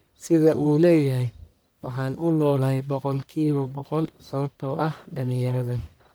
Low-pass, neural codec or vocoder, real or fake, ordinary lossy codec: none; codec, 44.1 kHz, 1.7 kbps, Pupu-Codec; fake; none